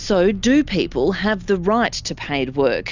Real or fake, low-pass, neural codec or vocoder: real; 7.2 kHz; none